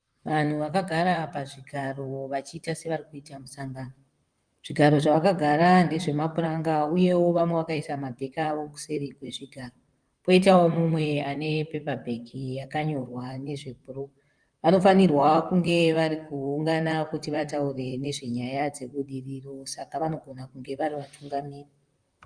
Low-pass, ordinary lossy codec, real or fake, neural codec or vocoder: 9.9 kHz; Opus, 32 kbps; fake; vocoder, 44.1 kHz, 128 mel bands, Pupu-Vocoder